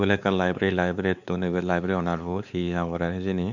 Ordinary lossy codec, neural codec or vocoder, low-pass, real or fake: none; codec, 16 kHz, 4 kbps, X-Codec, WavLM features, trained on Multilingual LibriSpeech; 7.2 kHz; fake